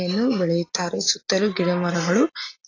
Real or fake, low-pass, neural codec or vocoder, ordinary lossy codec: real; 7.2 kHz; none; AAC, 32 kbps